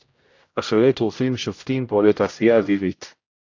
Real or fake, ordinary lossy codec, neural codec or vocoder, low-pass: fake; AAC, 48 kbps; codec, 16 kHz, 0.5 kbps, X-Codec, HuBERT features, trained on general audio; 7.2 kHz